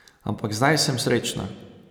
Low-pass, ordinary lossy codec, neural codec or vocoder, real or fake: none; none; none; real